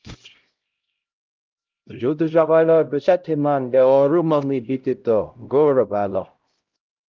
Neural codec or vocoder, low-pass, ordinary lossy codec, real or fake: codec, 16 kHz, 0.5 kbps, X-Codec, HuBERT features, trained on LibriSpeech; 7.2 kHz; Opus, 32 kbps; fake